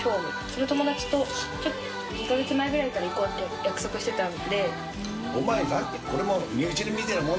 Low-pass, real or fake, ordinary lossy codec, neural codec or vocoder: none; real; none; none